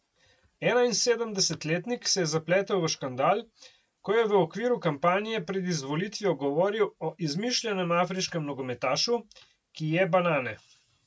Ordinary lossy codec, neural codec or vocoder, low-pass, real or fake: none; none; none; real